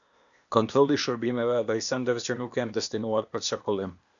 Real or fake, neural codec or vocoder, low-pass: fake; codec, 16 kHz, 0.8 kbps, ZipCodec; 7.2 kHz